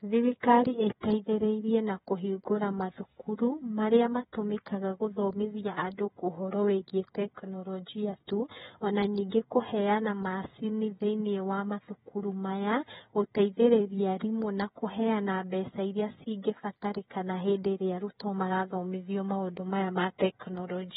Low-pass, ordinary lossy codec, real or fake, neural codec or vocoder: 7.2 kHz; AAC, 16 kbps; fake; codec, 16 kHz, 16 kbps, FreqCodec, smaller model